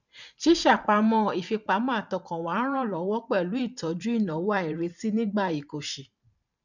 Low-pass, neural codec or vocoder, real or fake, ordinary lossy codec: 7.2 kHz; vocoder, 44.1 kHz, 128 mel bands every 512 samples, BigVGAN v2; fake; none